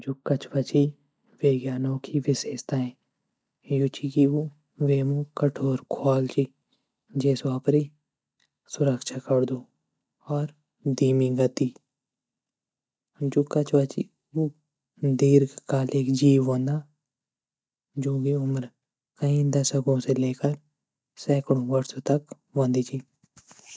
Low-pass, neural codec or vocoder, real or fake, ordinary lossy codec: none; none; real; none